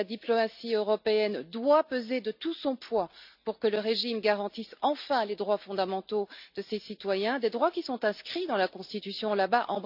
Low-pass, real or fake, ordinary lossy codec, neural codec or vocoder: 5.4 kHz; real; none; none